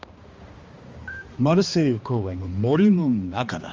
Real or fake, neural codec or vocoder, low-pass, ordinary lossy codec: fake; codec, 16 kHz, 2 kbps, X-Codec, HuBERT features, trained on balanced general audio; 7.2 kHz; Opus, 32 kbps